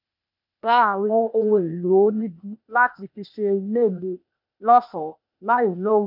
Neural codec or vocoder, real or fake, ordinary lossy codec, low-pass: codec, 16 kHz, 0.8 kbps, ZipCodec; fake; none; 5.4 kHz